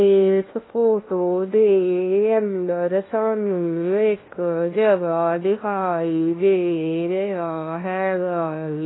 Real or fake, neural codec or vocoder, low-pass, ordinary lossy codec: fake; codec, 16 kHz, 1 kbps, FunCodec, trained on LibriTTS, 50 frames a second; 7.2 kHz; AAC, 16 kbps